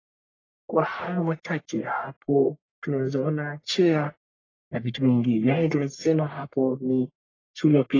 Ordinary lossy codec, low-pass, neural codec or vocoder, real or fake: AAC, 32 kbps; 7.2 kHz; codec, 44.1 kHz, 1.7 kbps, Pupu-Codec; fake